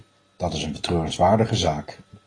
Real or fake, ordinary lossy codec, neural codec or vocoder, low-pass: real; AAC, 32 kbps; none; 9.9 kHz